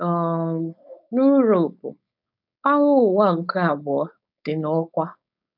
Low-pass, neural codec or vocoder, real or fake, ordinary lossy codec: 5.4 kHz; codec, 16 kHz, 4.8 kbps, FACodec; fake; none